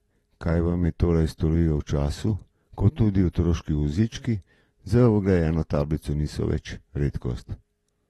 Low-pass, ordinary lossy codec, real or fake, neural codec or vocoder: 19.8 kHz; AAC, 32 kbps; real; none